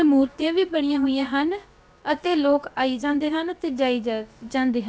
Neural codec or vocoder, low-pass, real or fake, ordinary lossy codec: codec, 16 kHz, about 1 kbps, DyCAST, with the encoder's durations; none; fake; none